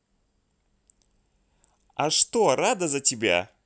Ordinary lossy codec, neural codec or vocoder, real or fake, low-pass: none; none; real; none